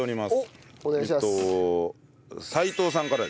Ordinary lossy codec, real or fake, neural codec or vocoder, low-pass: none; real; none; none